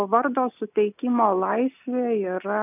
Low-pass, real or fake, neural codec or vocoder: 3.6 kHz; real; none